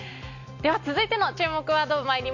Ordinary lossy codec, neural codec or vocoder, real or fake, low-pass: AAC, 48 kbps; none; real; 7.2 kHz